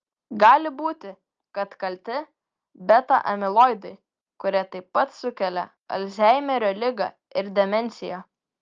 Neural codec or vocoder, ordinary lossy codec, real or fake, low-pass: none; Opus, 24 kbps; real; 7.2 kHz